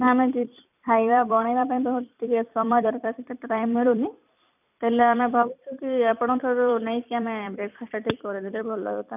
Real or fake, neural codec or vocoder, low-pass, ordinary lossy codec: fake; vocoder, 44.1 kHz, 128 mel bands every 256 samples, BigVGAN v2; 3.6 kHz; none